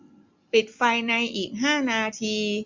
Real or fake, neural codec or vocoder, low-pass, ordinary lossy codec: real; none; 7.2 kHz; MP3, 48 kbps